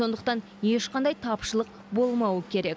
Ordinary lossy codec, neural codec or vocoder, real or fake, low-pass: none; none; real; none